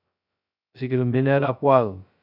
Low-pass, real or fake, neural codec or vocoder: 5.4 kHz; fake; codec, 16 kHz, 0.2 kbps, FocalCodec